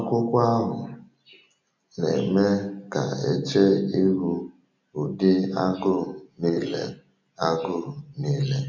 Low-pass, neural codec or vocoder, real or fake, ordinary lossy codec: 7.2 kHz; none; real; MP3, 48 kbps